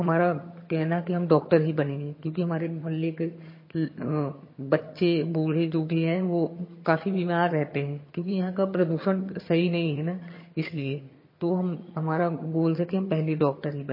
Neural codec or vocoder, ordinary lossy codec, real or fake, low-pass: vocoder, 22.05 kHz, 80 mel bands, HiFi-GAN; MP3, 24 kbps; fake; 5.4 kHz